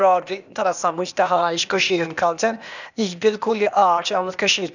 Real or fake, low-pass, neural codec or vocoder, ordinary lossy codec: fake; 7.2 kHz; codec, 16 kHz, 0.8 kbps, ZipCodec; none